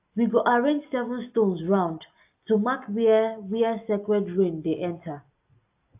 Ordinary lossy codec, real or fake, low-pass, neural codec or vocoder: AAC, 32 kbps; real; 3.6 kHz; none